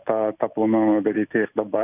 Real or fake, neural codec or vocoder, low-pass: real; none; 3.6 kHz